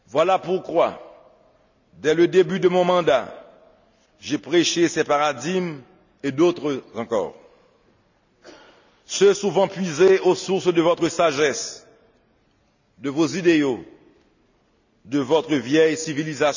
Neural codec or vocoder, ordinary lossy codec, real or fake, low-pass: none; none; real; 7.2 kHz